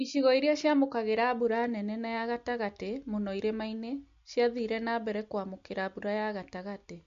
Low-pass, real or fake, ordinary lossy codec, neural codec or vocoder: 7.2 kHz; real; MP3, 48 kbps; none